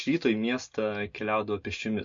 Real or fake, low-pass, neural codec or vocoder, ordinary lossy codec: real; 7.2 kHz; none; MP3, 48 kbps